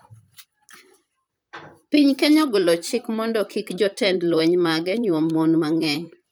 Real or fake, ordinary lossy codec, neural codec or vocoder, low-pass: fake; none; vocoder, 44.1 kHz, 128 mel bands, Pupu-Vocoder; none